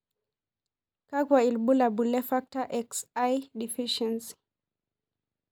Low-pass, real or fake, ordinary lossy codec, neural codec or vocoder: none; real; none; none